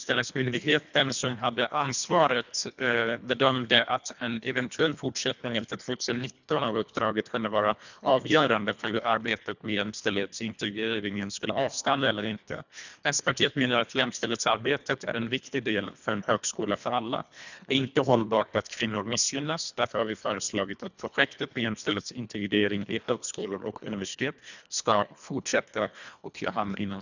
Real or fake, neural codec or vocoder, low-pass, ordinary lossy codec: fake; codec, 24 kHz, 1.5 kbps, HILCodec; 7.2 kHz; none